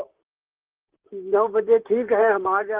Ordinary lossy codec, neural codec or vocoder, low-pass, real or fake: Opus, 16 kbps; vocoder, 44.1 kHz, 128 mel bands, Pupu-Vocoder; 3.6 kHz; fake